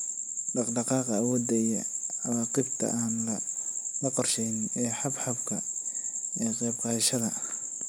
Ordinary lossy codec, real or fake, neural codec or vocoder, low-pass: none; real; none; none